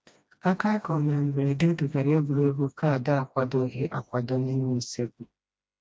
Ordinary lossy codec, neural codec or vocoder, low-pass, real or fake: none; codec, 16 kHz, 1 kbps, FreqCodec, smaller model; none; fake